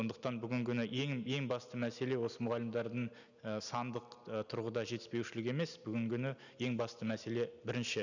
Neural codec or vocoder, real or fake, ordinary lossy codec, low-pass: none; real; none; 7.2 kHz